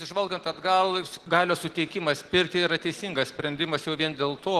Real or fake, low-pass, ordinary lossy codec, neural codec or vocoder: real; 14.4 kHz; Opus, 16 kbps; none